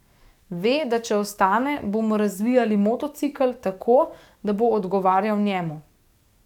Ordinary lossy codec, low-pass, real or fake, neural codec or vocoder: none; 19.8 kHz; fake; codec, 44.1 kHz, 7.8 kbps, DAC